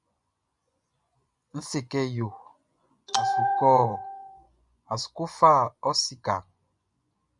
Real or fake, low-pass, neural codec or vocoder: fake; 10.8 kHz; vocoder, 24 kHz, 100 mel bands, Vocos